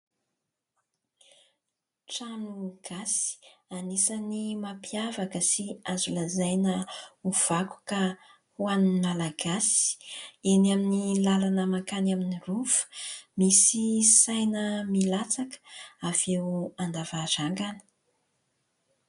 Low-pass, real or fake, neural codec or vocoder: 10.8 kHz; real; none